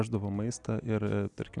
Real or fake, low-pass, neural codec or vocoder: real; 10.8 kHz; none